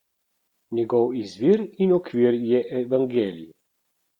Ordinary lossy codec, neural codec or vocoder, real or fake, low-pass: Opus, 64 kbps; none; real; 19.8 kHz